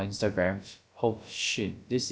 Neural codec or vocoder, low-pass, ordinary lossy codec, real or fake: codec, 16 kHz, about 1 kbps, DyCAST, with the encoder's durations; none; none; fake